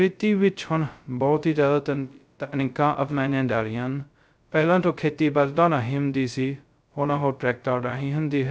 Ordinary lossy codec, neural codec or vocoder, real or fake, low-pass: none; codec, 16 kHz, 0.2 kbps, FocalCodec; fake; none